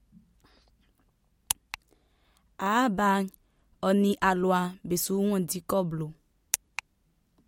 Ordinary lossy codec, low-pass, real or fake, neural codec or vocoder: MP3, 64 kbps; 19.8 kHz; real; none